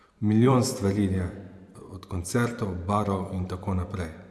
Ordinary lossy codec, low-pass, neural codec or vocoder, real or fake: none; none; vocoder, 24 kHz, 100 mel bands, Vocos; fake